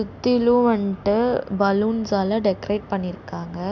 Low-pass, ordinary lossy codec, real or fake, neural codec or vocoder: 7.2 kHz; none; real; none